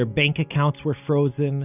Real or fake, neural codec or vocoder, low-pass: real; none; 3.6 kHz